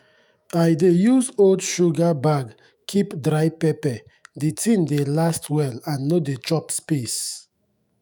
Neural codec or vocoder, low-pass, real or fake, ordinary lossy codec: autoencoder, 48 kHz, 128 numbers a frame, DAC-VAE, trained on Japanese speech; none; fake; none